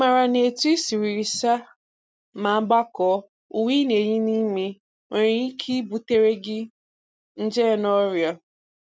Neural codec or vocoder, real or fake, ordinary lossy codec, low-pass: none; real; none; none